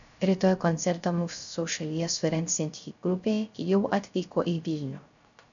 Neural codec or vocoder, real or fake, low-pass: codec, 16 kHz, 0.3 kbps, FocalCodec; fake; 7.2 kHz